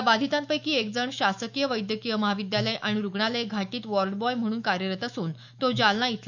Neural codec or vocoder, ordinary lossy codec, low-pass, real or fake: autoencoder, 48 kHz, 128 numbers a frame, DAC-VAE, trained on Japanese speech; none; 7.2 kHz; fake